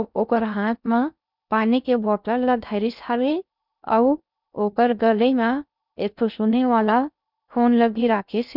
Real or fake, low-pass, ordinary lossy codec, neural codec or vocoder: fake; 5.4 kHz; none; codec, 16 kHz in and 24 kHz out, 0.6 kbps, FocalCodec, streaming, 2048 codes